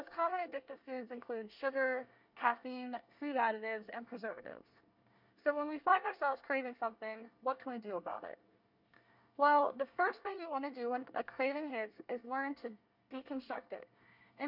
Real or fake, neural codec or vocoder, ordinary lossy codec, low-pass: fake; codec, 24 kHz, 1 kbps, SNAC; MP3, 48 kbps; 5.4 kHz